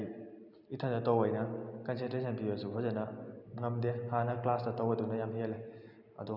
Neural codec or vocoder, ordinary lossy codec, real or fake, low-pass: none; none; real; 5.4 kHz